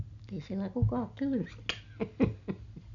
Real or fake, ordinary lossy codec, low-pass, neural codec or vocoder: real; none; 7.2 kHz; none